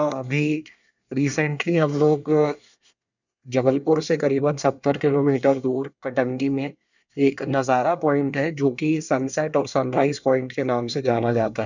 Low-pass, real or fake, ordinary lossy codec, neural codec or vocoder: 7.2 kHz; fake; none; codec, 24 kHz, 1 kbps, SNAC